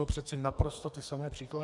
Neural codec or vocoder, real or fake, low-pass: codec, 44.1 kHz, 2.6 kbps, SNAC; fake; 10.8 kHz